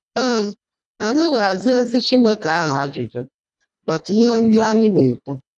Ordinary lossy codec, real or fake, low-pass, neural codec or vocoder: none; fake; 10.8 kHz; codec, 24 kHz, 1.5 kbps, HILCodec